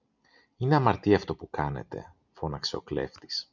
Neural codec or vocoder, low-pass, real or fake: none; 7.2 kHz; real